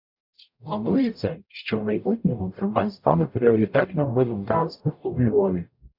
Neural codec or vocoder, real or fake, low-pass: codec, 44.1 kHz, 0.9 kbps, DAC; fake; 5.4 kHz